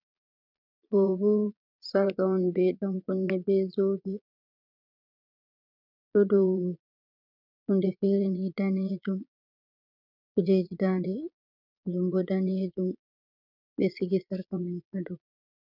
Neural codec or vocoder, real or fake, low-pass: vocoder, 22.05 kHz, 80 mel bands, Vocos; fake; 5.4 kHz